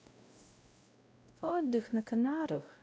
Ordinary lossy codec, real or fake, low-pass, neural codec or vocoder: none; fake; none; codec, 16 kHz, 0.5 kbps, X-Codec, WavLM features, trained on Multilingual LibriSpeech